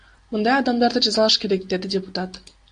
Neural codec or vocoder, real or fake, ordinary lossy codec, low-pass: none; real; AAC, 64 kbps; 9.9 kHz